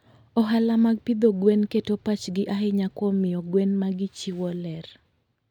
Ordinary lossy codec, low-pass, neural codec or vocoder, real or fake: none; 19.8 kHz; none; real